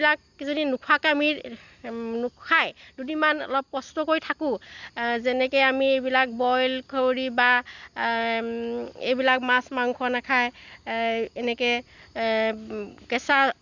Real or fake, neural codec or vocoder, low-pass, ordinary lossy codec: real; none; 7.2 kHz; Opus, 64 kbps